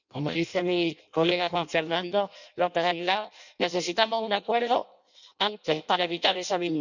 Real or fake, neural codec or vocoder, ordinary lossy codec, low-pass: fake; codec, 16 kHz in and 24 kHz out, 0.6 kbps, FireRedTTS-2 codec; none; 7.2 kHz